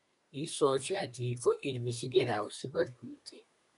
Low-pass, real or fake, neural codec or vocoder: 10.8 kHz; fake; codec, 24 kHz, 1 kbps, SNAC